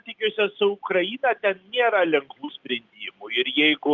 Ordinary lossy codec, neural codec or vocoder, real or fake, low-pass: Opus, 24 kbps; none; real; 7.2 kHz